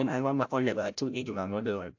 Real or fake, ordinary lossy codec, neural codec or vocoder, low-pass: fake; AAC, 48 kbps; codec, 16 kHz, 0.5 kbps, FreqCodec, larger model; 7.2 kHz